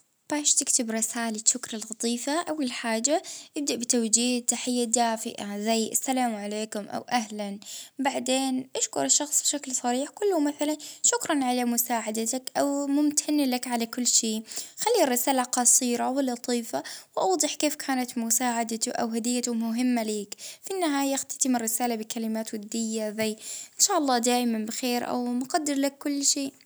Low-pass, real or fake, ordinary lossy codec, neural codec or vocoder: none; real; none; none